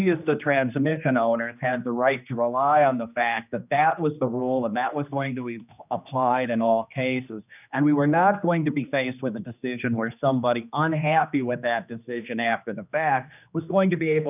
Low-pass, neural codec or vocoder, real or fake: 3.6 kHz; codec, 16 kHz, 2 kbps, X-Codec, HuBERT features, trained on general audio; fake